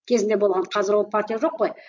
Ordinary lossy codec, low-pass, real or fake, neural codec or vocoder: MP3, 48 kbps; 7.2 kHz; real; none